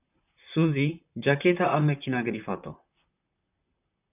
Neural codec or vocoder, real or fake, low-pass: vocoder, 44.1 kHz, 128 mel bands, Pupu-Vocoder; fake; 3.6 kHz